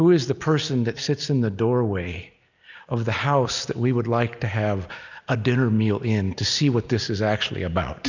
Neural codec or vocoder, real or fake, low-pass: none; real; 7.2 kHz